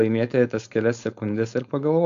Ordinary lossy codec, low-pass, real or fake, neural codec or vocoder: AAC, 64 kbps; 7.2 kHz; fake; codec, 16 kHz, 4.8 kbps, FACodec